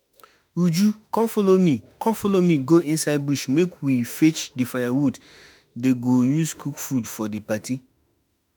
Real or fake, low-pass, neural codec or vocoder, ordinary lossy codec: fake; none; autoencoder, 48 kHz, 32 numbers a frame, DAC-VAE, trained on Japanese speech; none